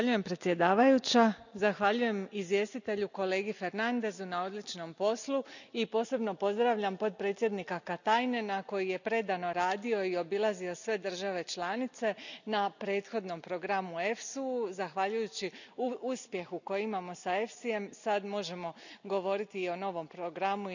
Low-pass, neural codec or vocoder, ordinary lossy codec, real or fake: 7.2 kHz; none; none; real